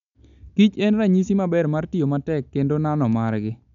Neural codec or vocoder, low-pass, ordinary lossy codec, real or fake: none; 7.2 kHz; none; real